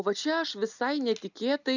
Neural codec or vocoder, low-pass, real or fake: none; 7.2 kHz; real